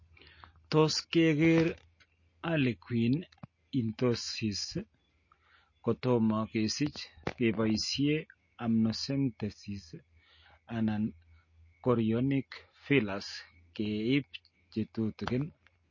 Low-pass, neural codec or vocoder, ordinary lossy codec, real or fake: 7.2 kHz; none; MP3, 32 kbps; real